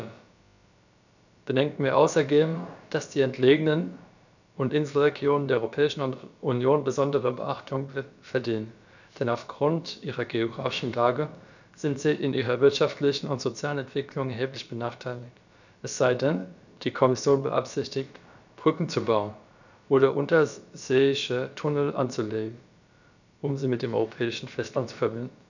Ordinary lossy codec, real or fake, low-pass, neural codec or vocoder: none; fake; 7.2 kHz; codec, 16 kHz, about 1 kbps, DyCAST, with the encoder's durations